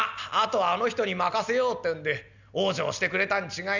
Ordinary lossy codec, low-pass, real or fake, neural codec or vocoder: none; 7.2 kHz; real; none